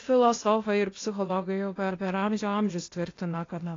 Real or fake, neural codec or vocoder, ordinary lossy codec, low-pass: fake; codec, 16 kHz, 0.8 kbps, ZipCodec; AAC, 32 kbps; 7.2 kHz